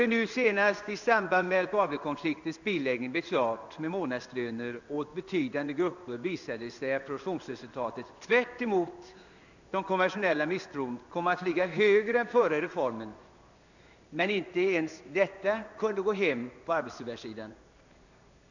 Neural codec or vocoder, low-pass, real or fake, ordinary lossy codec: codec, 16 kHz in and 24 kHz out, 1 kbps, XY-Tokenizer; 7.2 kHz; fake; none